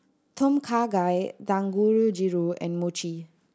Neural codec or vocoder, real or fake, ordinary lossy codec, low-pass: none; real; none; none